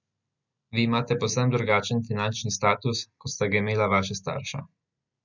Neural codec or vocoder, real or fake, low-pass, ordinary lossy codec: none; real; 7.2 kHz; none